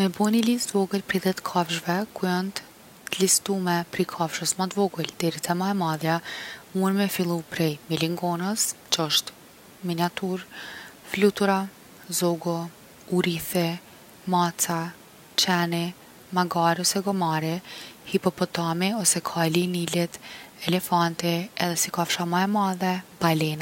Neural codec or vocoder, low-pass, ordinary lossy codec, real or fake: none; 19.8 kHz; none; real